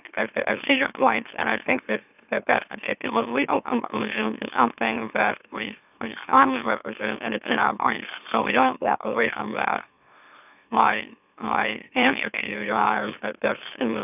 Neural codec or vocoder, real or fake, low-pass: autoencoder, 44.1 kHz, a latent of 192 numbers a frame, MeloTTS; fake; 3.6 kHz